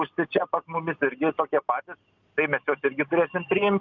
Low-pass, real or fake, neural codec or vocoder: 7.2 kHz; real; none